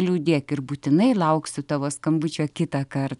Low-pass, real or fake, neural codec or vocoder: 10.8 kHz; real; none